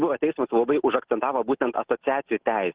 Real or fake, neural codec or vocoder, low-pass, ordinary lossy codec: real; none; 3.6 kHz; Opus, 16 kbps